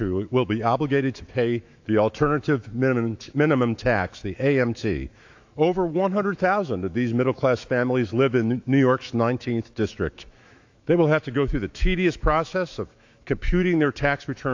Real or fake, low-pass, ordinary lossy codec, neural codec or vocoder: fake; 7.2 kHz; AAC, 48 kbps; autoencoder, 48 kHz, 128 numbers a frame, DAC-VAE, trained on Japanese speech